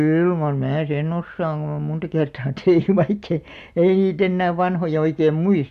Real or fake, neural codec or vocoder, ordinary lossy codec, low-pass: real; none; MP3, 96 kbps; 14.4 kHz